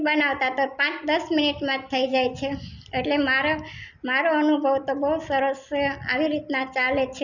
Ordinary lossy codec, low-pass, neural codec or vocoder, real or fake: none; 7.2 kHz; none; real